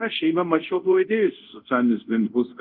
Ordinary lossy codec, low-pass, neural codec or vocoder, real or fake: Opus, 32 kbps; 5.4 kHz; codec, 24 kHz, 0.5 kbps, DualCodec; fake